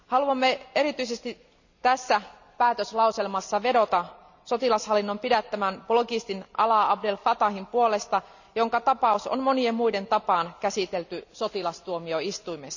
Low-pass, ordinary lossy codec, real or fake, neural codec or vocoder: 7.2 kHz; none; real; none